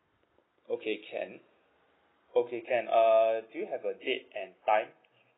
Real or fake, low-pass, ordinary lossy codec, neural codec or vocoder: real; 7.2 kHz; AAC, 16 kbps; none